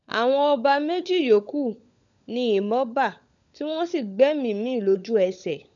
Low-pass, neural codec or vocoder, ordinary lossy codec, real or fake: 7.2 kHz; codec, 16 kHz, 16 kbps, FunCodec, trained on LibriTTS, 50 frames a second; none; fake